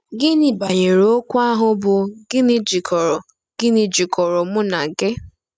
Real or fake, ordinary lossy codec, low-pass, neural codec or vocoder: real; none; none; none